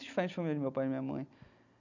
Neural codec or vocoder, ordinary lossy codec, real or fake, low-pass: none; none; real; 7.2 kHz